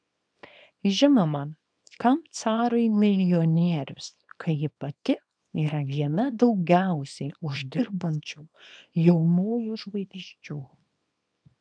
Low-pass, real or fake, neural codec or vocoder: 9.9 kHz; fake; codec, 24 kHz, 0.9 kbps, WavTokenizer, small release